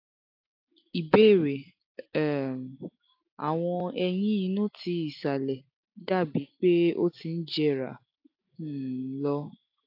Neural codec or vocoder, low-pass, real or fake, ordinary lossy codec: none; 5.4 kHz; real; AAC, 48 kbps